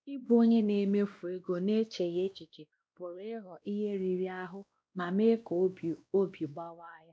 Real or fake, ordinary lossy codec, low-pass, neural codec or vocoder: fake; none; none; codec, 16 kHz, 1 kbps, X-Codec, WavLM features, trained on Multilingual LibriSpeech